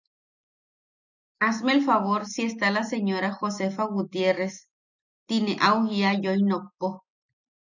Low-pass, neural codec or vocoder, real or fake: 7.2 kHz; none; real